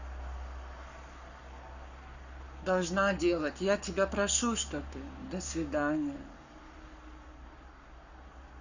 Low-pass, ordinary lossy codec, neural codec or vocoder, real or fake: 7.2 kHz; Opus, 64 kbps; codec, 44.1 kHz, 7.8 kbps, Pupu-Codec; fake